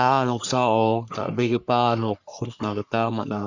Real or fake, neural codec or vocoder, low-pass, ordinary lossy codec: fake; codec, 44.1 kHz, 3.4 kbps, Pupu-Codec; 7.2 kHz; Opus, 64 kbps